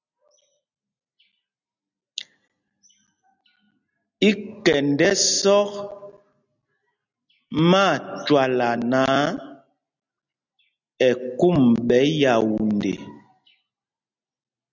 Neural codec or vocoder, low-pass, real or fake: none; 7.2 kHz; real